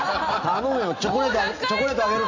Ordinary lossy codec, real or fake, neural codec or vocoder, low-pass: MP3, 48 kbps; real; none; 7.2 kHz